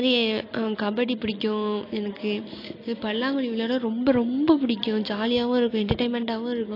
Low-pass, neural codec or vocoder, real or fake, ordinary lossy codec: 5.4 kHz; none; real; AAC, 32 kbps